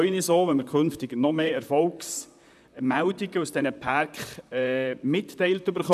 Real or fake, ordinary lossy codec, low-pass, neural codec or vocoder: fake; none; 14.4 kHz; vocoder, 44.1 kHz, 128 mel bands, Pupu-Vocoder